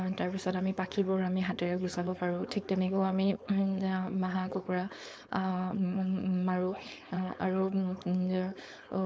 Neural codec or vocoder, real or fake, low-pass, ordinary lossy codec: codec, 16 kHz, 4.8 kbps, FACodec; fake; none; none